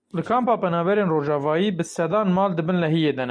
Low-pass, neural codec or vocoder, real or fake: 9.9 kHz; none; real